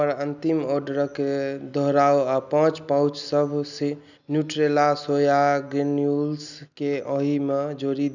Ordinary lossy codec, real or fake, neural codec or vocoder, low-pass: none; real; none; 7.2 kHz